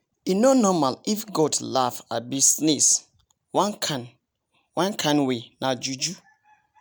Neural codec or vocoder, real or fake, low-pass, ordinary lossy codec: none; real; none; none